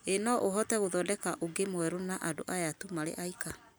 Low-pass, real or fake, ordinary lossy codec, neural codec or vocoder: none; real; none; none